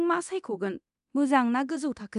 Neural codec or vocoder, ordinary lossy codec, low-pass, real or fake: codec, 24 kHz, 0.9 kbps, DualCodec; none; 10.8 kHz; fake